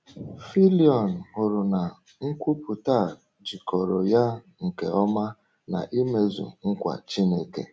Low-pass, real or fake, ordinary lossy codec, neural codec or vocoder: none; real; none; none